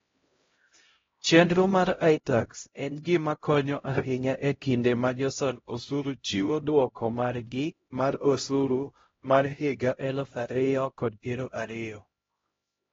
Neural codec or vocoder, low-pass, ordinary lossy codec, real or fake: codec, 16 kHz, 0.5 kbps, X-Codec, HuBERT features, trained on LibriSpeech; 7.2 kHz; AAC, 24 kbps; fake